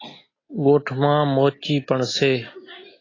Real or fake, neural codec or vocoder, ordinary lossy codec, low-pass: real; none; AAC, 32 kbps; 7.2 kHz